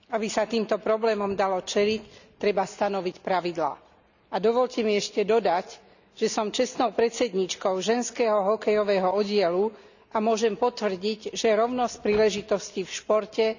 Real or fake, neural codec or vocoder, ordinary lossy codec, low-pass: real; none; none; 7.2 kHz